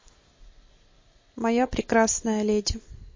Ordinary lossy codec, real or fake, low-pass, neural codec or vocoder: MP3, 32 kbps; real; 7.2 kHz; none